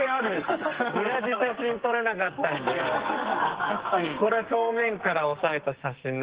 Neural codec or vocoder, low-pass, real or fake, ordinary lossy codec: codec, 44.1 kHz, 2.6 kbps, SNAC; 3.6 kHz; fake; Opus, 16 kbps